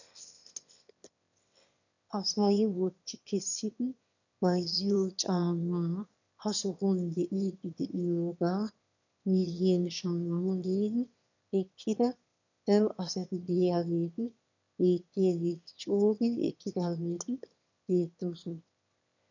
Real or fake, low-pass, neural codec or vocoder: fake; 7.2 kHz; autoencoder, 22.05 kHz, a latent of 192 numbers a frame, VITS, trained on one speaker